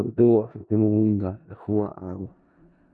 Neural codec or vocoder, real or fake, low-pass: codec, 16 kHz in and 24 kHz out, 0.4 kbps, LongCat-Audio-Codec, four codebook decoder; fake; 10.8 kHz